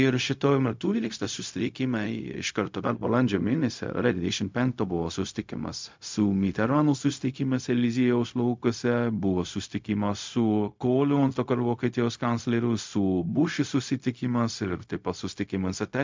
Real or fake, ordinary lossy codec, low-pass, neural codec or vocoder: fake; MP3, 64 kbps; 7.2 kHz; codec, 16 kHz, 0.4 kbps, LongCat-Audio-Codec